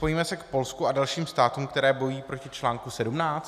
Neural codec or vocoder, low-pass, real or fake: none; 14.4 kHz; real